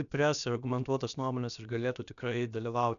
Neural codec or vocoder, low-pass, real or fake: codec, 16 kHz, about 1 kbps, DyCAST, with the encoder's durations; 7.2 kHz; fake